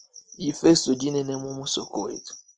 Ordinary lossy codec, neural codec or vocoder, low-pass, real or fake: Opus, 24 kbps; none; 9.9 kHz; real